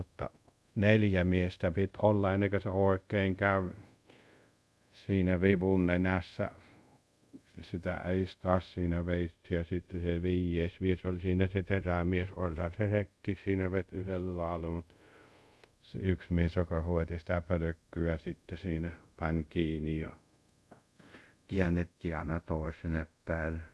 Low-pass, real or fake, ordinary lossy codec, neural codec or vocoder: none; fake; none; codec, 24 kHz, 0.5 kbps, DualCodec